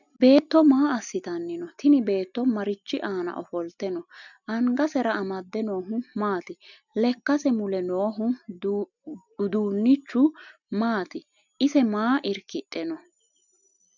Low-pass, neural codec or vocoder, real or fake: 7.2 kHz; none; real